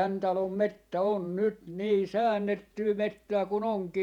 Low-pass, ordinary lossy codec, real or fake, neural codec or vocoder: 19.8 kHz; none; fake; vocoder, 48 kHz, 128 mel bands, Vocos